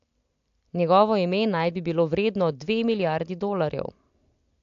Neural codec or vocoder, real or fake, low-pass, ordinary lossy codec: none; real; 7.2 kHz; AAC, 64 kbps